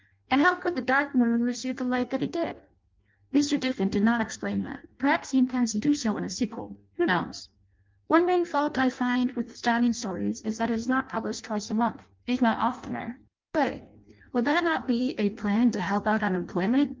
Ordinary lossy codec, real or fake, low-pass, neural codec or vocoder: Opus, 24 kbps; fake; 7.2 kHz; codec, 16 kHz in and 24 kHz out, 0.6 kbps, FireRedTTS-2 codec